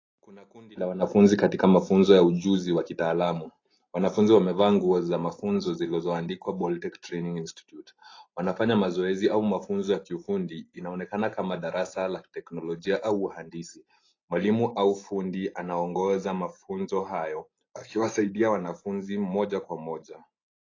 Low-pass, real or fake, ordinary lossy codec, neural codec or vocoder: 7.2 kHz; real; AAC, 32 kbps; none